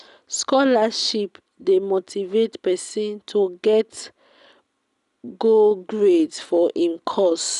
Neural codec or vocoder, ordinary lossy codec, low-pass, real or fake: none; none; 10.8 kHz; real